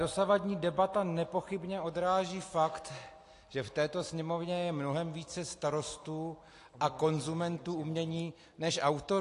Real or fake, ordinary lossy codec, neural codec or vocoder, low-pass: real; AAC, 48 kbps; none; 10.8 kHz